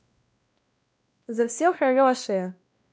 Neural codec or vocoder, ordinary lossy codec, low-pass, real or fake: codec, 16 kHz, 1 kbps, X-Codec, WavLM features, trained on Multilingual LibriSpeech; none; none; fake